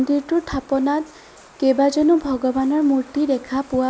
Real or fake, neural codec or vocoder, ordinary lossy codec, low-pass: real; none; none; none